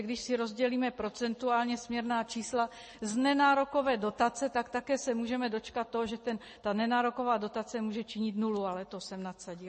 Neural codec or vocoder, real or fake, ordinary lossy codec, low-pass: none; real; MP3, 32 kbps; 9.9 kHz